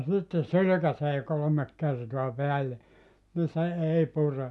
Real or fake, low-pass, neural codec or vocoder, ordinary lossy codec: real; none; none; none